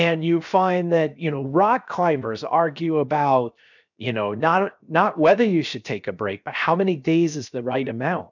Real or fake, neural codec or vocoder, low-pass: fake; codec, 16 kHz, 0.7 kbps, FocalCodec; 7.2 kHz